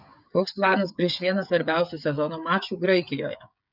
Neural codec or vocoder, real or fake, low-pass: codec, 16 kHz, 16 kbps, FreqCodec, smaller model; fake; 5.4 kHz